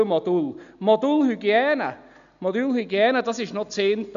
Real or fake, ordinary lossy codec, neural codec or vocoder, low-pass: real; none; none; 7.2 kHz